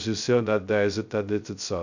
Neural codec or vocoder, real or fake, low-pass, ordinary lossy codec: codec, 16 kHz, 0.2 kbps, FocalCodec; fake; 7.2 kHz; none